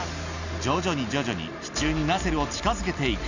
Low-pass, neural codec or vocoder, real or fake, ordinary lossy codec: 7.2 kHz; none; real; none